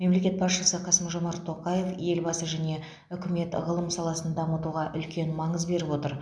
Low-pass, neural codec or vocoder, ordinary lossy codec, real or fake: none; none; none; real